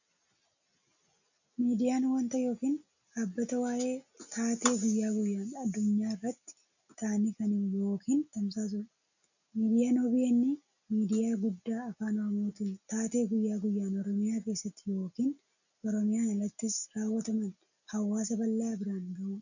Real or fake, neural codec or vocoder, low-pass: real; none; 7.2 kHz